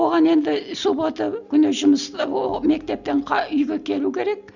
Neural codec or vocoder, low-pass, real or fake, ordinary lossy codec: none; 7.2 kHz; real; none